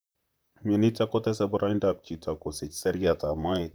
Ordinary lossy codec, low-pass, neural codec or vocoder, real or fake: none; none; vocoder, 44.1 kHz, 128 mel bands, Pupu-Vocoder; fake